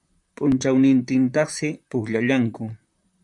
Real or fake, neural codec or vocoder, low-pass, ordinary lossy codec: fake; vocoder, 44.1 kHz, 128 mel bands, Pupu-Vocoder; 10.8 kHz; MP3, 96 kbps